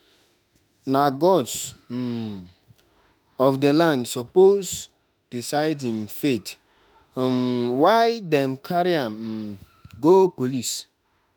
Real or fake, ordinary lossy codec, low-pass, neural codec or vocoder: fake; none; none; autoencoder, 48 kHz, 32 numbers a frame, DAC-VAE, trained on Japanese speech